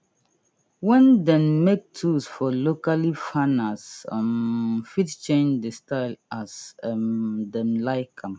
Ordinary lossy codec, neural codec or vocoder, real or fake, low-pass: none; none; real; none